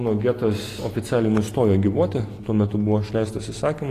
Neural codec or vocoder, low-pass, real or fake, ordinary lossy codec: none; 14.4 kHz; real; AAC, 48 kbps